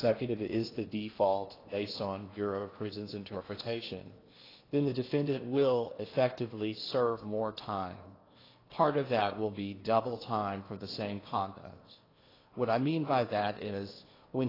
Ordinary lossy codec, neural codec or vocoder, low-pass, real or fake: AAC, 24 kbps; codec, 16 kHz in and 24 kHz out, 0.8 kbps, FocalCodec, streaming, 65536 codes; 5.4 kHz; fake